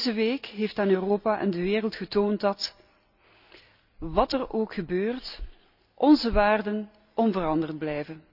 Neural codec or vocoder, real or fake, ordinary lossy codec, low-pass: none; real; none; 5.4 kHz